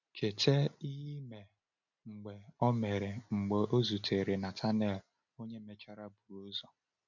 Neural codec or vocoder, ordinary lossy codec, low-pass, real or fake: none; none; 7.2 kHz; real